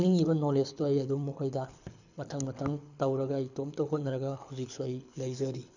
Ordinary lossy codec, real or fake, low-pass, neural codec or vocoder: none; fake; 7.2 kHz; codec, 24 kHz, 6 kbps, HILCodec